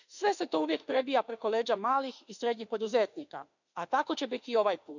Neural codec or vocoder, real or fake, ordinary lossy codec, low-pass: autoencoder, 48 kHz, 32 numbers a frame, DAC-VAE, trained on Japanese speech; fake; none; 7.2 kHz